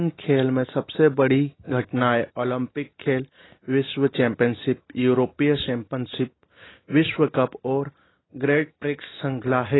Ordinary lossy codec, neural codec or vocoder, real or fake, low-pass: AAC, 16 kbps; none; real; 7.2 kHz